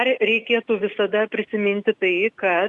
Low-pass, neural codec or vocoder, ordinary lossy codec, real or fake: 10.8 kHz; none; Opus, 64 kbps; real